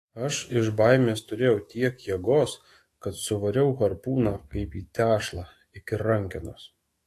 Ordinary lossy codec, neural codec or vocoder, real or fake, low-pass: AAC, 48 kbps; autoencoder, 48 kHz, 128 numbers a frame, DAC-VAE, trained on Japanese speech; fake; 14.4 kHz